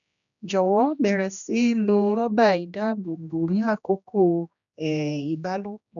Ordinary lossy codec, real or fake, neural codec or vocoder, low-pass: none; fake; codec, 16 kHz, 1 kbps, X-Codec, HuBERT features, trained on general audio; 7.2 kHz